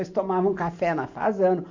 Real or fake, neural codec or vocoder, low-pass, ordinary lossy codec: real; none; 7.2 kHz; AAC, 48 kbps